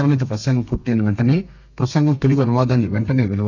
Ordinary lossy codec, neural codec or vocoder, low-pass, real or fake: none; codec, 32 kHz, 1.9 kbps, SNAC; 7.2 kHz; fake